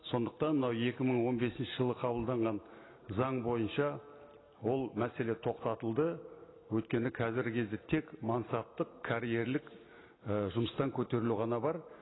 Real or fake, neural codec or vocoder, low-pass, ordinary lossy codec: real; none; 7.2 kHz; AAC, 16 kbps